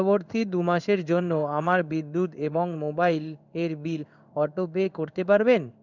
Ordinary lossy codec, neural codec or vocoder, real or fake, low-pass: none; codec, 16 kHz in and 24 kHz out, 1 kbps, XY-Tokenizer; fake; 7.2 kHz